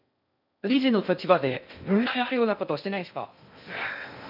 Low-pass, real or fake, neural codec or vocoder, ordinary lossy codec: 5.4 kHz; fake; codec, 16 kHz in and 24 kHz out, 0.6 kbps, FocalCodec, streaming, 2048 codes; none